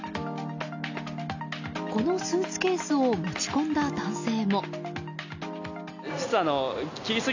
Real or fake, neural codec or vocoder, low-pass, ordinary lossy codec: real; none; 7.2 kHz; AAC, 48 kbps